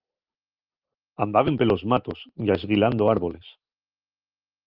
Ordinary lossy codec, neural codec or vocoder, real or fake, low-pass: Opus, 24 kbps; none; real; 5.4 kHz